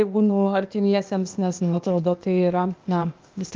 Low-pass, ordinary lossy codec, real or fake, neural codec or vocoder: 7.2 kHz; Opus, 24 kbps; fake; codec, 16 kHz, 0.8 kbps, ZipCodec